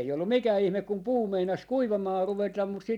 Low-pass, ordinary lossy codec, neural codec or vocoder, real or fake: 19.8 kHz; none; none; real